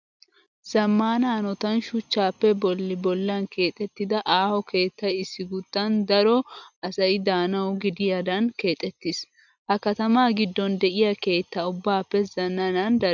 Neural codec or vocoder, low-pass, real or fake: none; 7.2 kHz; real